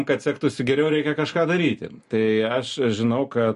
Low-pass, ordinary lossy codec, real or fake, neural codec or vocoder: 10.8 kHz; MP3, 48 kbps; real; none